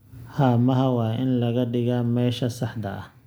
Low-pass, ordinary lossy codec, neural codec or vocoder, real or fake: none; none; none; real